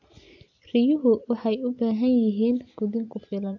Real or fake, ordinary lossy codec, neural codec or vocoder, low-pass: real; AAC, 48 kbps; none; 7.2 kHz